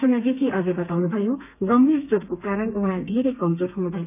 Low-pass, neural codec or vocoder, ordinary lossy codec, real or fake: 3.6 kHz; codec, 32 kHz, 1.9 kbps, SNAC; none; fake